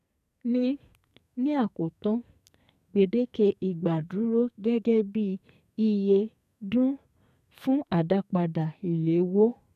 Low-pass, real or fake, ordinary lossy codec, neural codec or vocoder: 14.4 kHz; fake; none; codec, 44.1 kHz, 2.6 kbps, SNAC